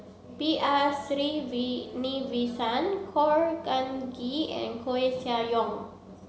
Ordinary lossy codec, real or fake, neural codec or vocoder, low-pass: none; real; none; none